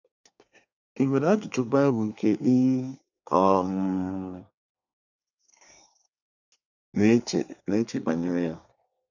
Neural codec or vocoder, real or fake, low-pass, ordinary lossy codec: codec, 24 kHz, 1 kbps, SNAC; fake; 7.2 kHz; none